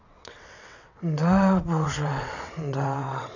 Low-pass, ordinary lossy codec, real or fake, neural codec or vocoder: 7.2 kHz; none; fake; vocoder, 22.05 kHz, 80 mel bands, WaveNeXt